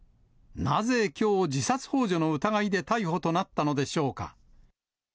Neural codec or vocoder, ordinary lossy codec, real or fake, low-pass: none; none; real; none